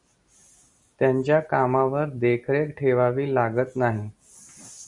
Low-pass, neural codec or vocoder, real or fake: 10.8 kHz; none; real